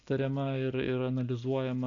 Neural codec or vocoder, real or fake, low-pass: none; real; 7.2 kHz